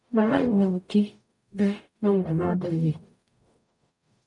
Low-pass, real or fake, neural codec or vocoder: 10.8 kHz; fake; codec, 44.1 kHz, 0.9 kbps, DAC